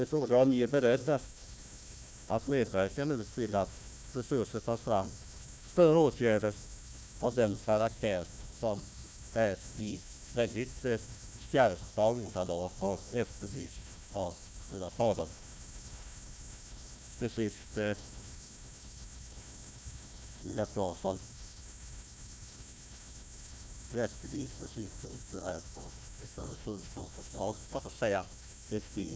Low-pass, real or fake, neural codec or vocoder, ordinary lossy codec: none; fake; codec, 16 kHz, 1 kbps, FunCodec, trained on Chinese and English, 50 frames a second; none